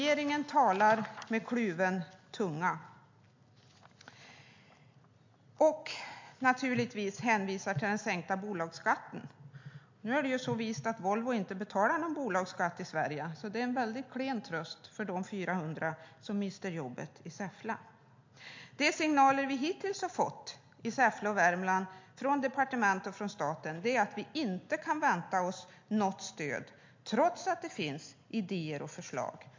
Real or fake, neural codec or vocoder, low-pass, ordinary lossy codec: real; none; 7.2 kHz; MP3, 48 kbps